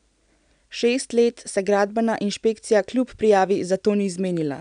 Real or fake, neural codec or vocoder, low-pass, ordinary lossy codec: real; none; 9.9 kHz; none